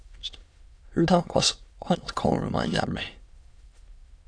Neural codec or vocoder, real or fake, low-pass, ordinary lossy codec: autoencoder, 22.05 kHz, a latent of 192 numbers a frame, VITS, trained on many speakers; fake; 9.9 kHz; AAC, 64 kbps